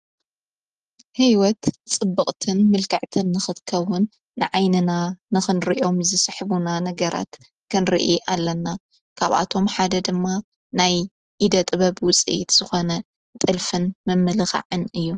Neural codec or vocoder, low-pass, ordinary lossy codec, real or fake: none; 10.8 kHz; Opus, 24 kbps; real